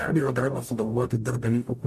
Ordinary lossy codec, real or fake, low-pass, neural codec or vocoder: MP3, 64 kbps; fake; 14.4 kHz; codec, 44.1 kHz, 0.9 kbps, DAC